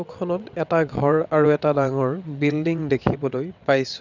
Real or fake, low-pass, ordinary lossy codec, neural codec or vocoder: fake; 7.2 kHz; none; vocoder, 22.05 kHz, 80 mel bands, WaveNeXt